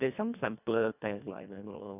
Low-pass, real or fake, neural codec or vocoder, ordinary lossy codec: 3.6 kHz; fake; codec, 24 kHz, 1.5 kbps, HILCodec; none